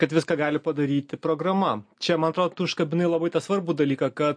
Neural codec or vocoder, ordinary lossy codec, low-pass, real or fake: none; MP3, 48 kbps; 9.9 kHz; real